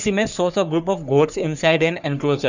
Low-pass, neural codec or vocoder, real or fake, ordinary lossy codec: 7.2 kHz; codec, 44.1 kHz, 3.4 kbps, Pupu-Codec; fake; Opus, 64 kbps